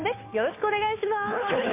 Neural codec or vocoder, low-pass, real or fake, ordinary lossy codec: codec, 16 kHz, 2 kbps, FunCodec, trained on Chinese and English, 25 frames a second; 3.6 kHz; fake; MP3, 24 kbps